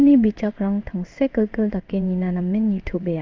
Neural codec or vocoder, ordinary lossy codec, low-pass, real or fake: codec, 16 kHz in and 24 kHz out, 1 kbps, XY-Tokenizer; Opus, 24 kbps; 7.2 kHz; fake